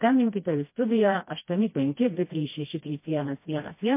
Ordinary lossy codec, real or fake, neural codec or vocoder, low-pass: MP3, 24 kbps; fake; codec, 16 kHz, 1 kbps, FreqCodec, smaller model; 3.6 kHz